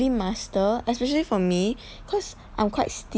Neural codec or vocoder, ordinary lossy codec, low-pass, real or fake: none; none; none; real